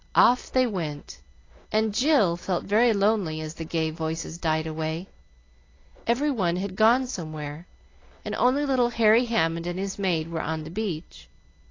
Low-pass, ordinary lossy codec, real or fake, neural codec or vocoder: 7.2 kHz; AAC, 32 kbps; real; none